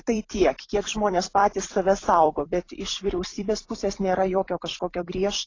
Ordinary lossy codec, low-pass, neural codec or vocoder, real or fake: AAC, 32 kbps; 7.2 kHz; vocoder, 44.1 kHz, 128 mel bands every 256 samples, BigVGAN v2; fake